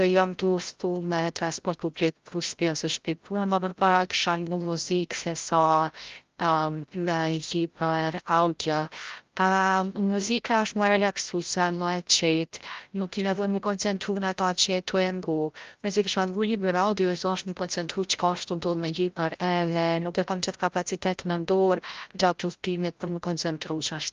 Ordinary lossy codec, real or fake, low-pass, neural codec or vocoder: Opus, 32 kbps; fake; 7.2 kHz; codec, 16 kHz, 0.5 kbps, FreqCodec, larger model